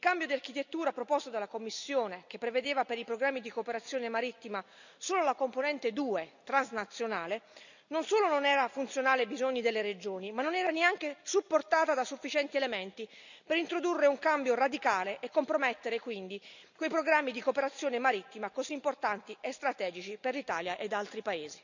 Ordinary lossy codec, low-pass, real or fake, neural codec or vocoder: none; 7.2 kHz; real; none